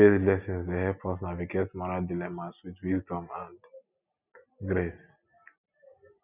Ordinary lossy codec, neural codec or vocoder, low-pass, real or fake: none; none; 3.6 kHz; real